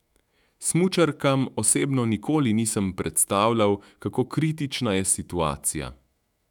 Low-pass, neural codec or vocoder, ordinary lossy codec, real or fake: 19.8 kHz; autoencoder, 48 kHz, 128 numbers a frame, DAC-VAE, trained on Japanese speech; none; fake